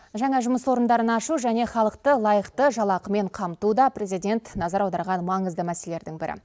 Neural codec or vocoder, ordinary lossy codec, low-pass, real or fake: none; none; none; real